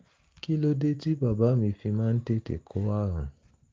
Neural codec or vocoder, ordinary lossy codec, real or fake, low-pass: none; Opus, 24 kbps; real; 7.2 kHz